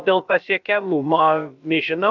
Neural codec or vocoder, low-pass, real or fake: codec, 16 kHz, about 1 kbps, DyCAST, with the encoder's durations; 7.2 kHz; fake